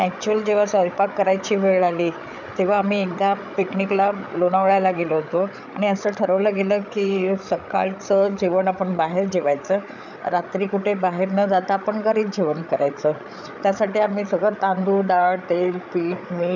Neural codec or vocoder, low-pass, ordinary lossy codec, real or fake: codec, 16 kHz, 8 kbps, FreqCodec, larger model; 7.2 kHz; none; fake